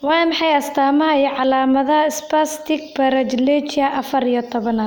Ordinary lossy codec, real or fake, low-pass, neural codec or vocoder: none; real; none; none